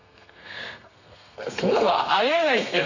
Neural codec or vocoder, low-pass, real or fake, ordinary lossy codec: codec, 32 kHz, 1.9 kbps, SNAC; 7.2 kHz; fake; none